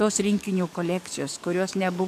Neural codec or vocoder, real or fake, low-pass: codec, 44.1 kHz, 7.8 kbps, DAC; fake; 14.4 kHz